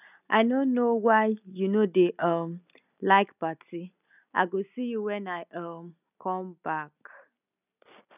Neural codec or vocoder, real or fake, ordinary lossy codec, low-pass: none; real; none; 3.6 kHz